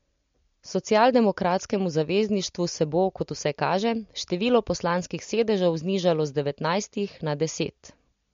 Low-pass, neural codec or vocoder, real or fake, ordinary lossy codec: 7.2 kHz; none; real; MP3, 48 kbps